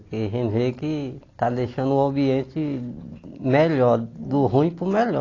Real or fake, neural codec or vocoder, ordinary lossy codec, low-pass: real; none; AAC, 32 kbps; 7.2 kHz